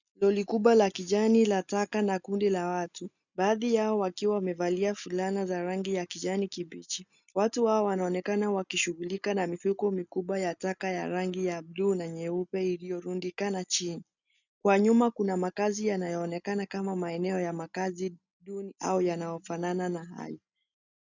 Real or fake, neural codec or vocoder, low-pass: real; none; 7.2 kHz